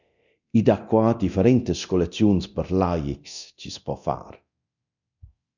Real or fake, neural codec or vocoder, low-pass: fake; codec, 24 kHz, 0.9 kbps, DualCodec; 7.2 kHz